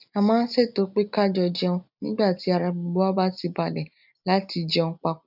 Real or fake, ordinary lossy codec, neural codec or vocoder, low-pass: real; none; none; 5.4 kHz